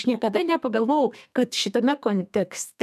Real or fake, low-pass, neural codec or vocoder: fake; 14.4 kHz; codec, 32 kHz, 1.9 kbps, SNAC